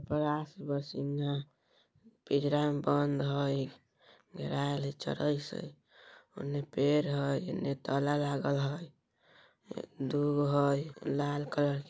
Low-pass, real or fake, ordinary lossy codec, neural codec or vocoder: none; real; none; none